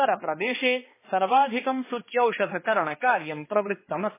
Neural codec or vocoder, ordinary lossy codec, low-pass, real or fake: codec, 16 kHz, 2 kbps, X-Codec, HuBERT features, trained on balanced general audio; MP3, 16 kbps; 3.6 kHz; fake